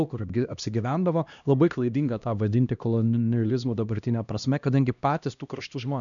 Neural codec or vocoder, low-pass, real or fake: codec, 16 kHz, 1 kbps, X-Codec, HuBERT features, trained on LibriSpeech; 7.2 kHz; fake